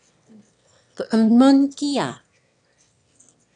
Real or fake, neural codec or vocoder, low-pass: fake; autoencoder, 22.05 kHz, a latent of 192 numbers a frame, VITS, trained on one speaker; 9.9 kHz